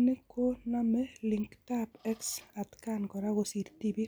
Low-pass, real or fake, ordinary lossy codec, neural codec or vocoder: none; real; none; none